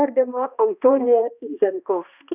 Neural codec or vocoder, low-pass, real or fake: codec, 16 kHz, 1 kbps, X-Codec, HuBERT features, trained on balanced general audio; 3.6 kHz; fake